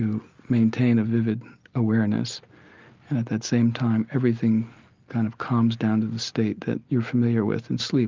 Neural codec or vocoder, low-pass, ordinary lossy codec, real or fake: none; 7.2 kHz; Opus, 32 kbps; real